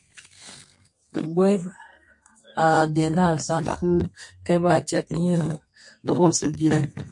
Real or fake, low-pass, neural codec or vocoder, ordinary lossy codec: fake; 10.8 kHz; codec, 32 kHz, 1.9 kbps, SNAC; MP3, 48 kbps